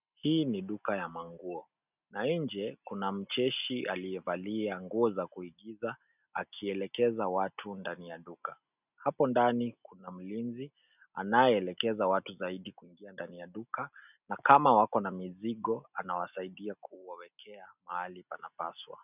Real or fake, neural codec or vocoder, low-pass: real; none; 3.6 kHz